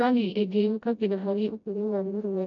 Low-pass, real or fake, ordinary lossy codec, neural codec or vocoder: 7.2 kHz; fake; none; codec, 16 kHz, 0.5 kbps, FreqCodec, smaller model